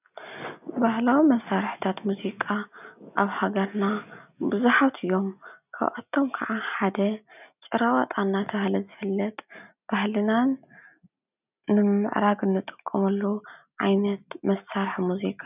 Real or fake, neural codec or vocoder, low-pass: real; none; 3.6 kHz